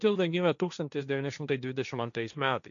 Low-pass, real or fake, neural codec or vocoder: 7.2 kHz; fake; codec, 16 kHz, 1.1 kbps, Voila-Tokenizer